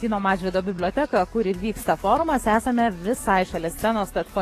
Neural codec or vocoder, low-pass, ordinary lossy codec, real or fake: codec, 44.1 kHz, 7.8 kbps, DAC; 14.4 kHz; AAC, 48 kbps; fake